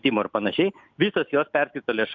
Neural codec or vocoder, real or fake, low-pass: none; real; 7.2 kHz